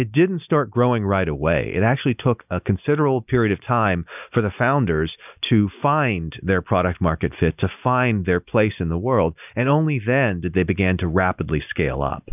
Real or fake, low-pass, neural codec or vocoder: real; 3.6 kHz; none